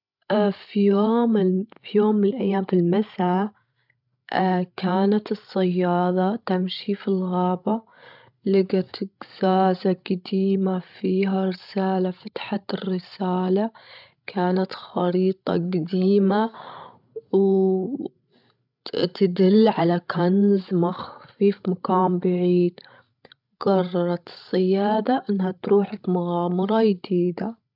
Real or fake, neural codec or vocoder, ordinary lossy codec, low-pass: fake; codec, 16 kHz, 16 kbps, FreqCodec, larger model; none; 5.4 kHz